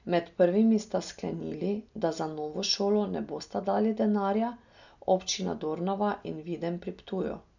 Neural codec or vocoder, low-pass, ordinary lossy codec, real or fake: none; 7.2 kHz; none; real